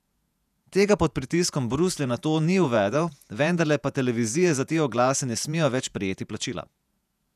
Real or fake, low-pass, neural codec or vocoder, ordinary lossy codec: fake; 14.4 kHz; vocoder, 48 kHz, 128 mel bands, Vocos; none